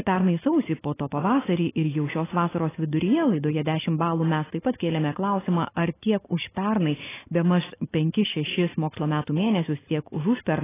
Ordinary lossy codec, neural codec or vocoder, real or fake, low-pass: AAC, 16 kbps; none; real; 3.6 kHz